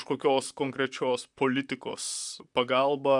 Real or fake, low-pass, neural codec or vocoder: fake; 10.8 kHz; vocoder, 44.1 kHz, 128 mel bands, Pupu-Vocoder